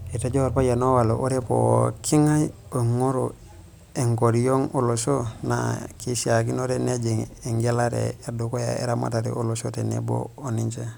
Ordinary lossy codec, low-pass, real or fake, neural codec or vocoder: none; none; real; none